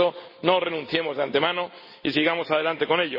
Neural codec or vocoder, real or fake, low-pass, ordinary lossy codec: none; real; 5.4 kHz; MP3, 24 kbps